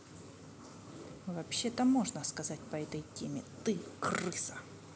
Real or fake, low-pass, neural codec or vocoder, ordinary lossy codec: real; none; none; none